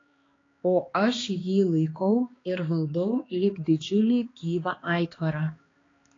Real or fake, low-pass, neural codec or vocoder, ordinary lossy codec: fake; 7.2 kHz; codec, 16 kHz, 2 kbps, X-Codec, HuBERT features, trained on balanced general audio; AAC, 32 kbps